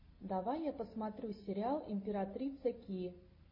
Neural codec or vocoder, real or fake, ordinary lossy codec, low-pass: none; real; MP3, 24 kbps; 5.4 kHz